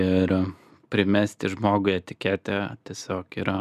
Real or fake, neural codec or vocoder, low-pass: real; none; 14.4 kHz